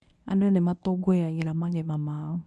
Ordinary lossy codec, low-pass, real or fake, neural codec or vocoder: none; none; fake; codec, 24 kHz, 0.9 kbps, WavTokenizer, medium speech release version 1